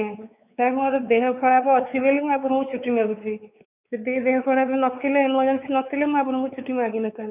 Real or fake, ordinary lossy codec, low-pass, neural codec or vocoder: fake; none; 3.6 kHz; codec, 16 kHz, 4 kbps, X-Codec, WavLM features, trained on Multilingual LibriSpeech